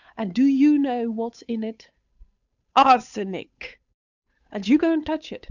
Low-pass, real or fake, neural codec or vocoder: 7.2 kHz; fake; codec, 16 kHz, 8 kbps, FunCodec, trained on Chinese and English, 25 frames a second